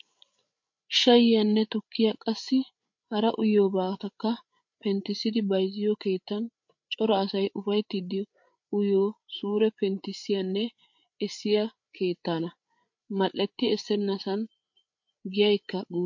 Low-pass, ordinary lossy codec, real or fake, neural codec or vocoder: 7.2 kHz; MP3, 48 kbps; fake; codec, 16 kHz, 8 kbps, FreqCodec, larger model